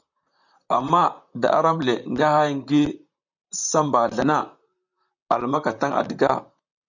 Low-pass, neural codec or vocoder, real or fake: 7.2 kHz; vocoder, 44.1 kHz, 128 mel bands, Pupu-Vocoder; fake